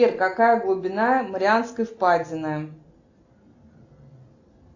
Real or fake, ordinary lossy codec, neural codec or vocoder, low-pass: real; AAC, 48 kbps; none; 7.2 kHz